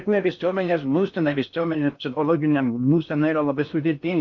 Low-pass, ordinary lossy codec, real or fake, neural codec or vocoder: 7.2 kHz; MP3, 64 kbps; fake; codec, 16 kHz in and 24 kHz out, 0.6 kbps, FocalCodec, streaming, 4096 codes